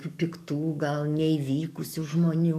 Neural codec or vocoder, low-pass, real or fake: codec, 44.1 kHz, 7.8 kbps, DAC; 14.4 kHz; fake